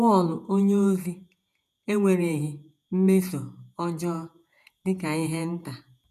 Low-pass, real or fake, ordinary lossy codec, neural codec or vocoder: 14.4 kHz; fake; none; vocoder, 48 kHz, 128 mel bands, Vocos